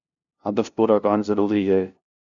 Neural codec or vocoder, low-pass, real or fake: codec, 16 kHz, 0.5 kbps, FunCodec, trained on LibriTTS, 25 frames a second; 7.2 kHz; fake